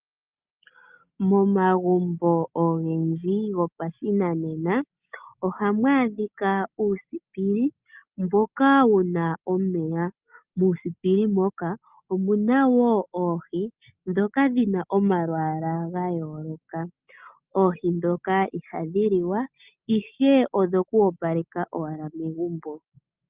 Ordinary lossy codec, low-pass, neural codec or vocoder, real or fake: Opus, 24 kbps; 3.6 kHz; none; real